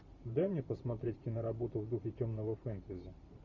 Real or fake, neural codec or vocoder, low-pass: fake; vocoder, 44.1 kHz, 128 mel bands every 512 samples, BigVGAN v2; 7.2 kHz